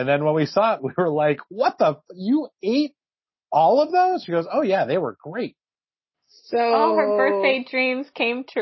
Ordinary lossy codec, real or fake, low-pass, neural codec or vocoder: MP3, 24 kbps; real; 7.2 kHz; none